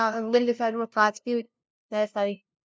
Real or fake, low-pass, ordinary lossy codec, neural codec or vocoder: fake; none; none; codec, 16 kHz, 0.5 kbps, FunCodec, trained on LibriTTS, 25 frames a second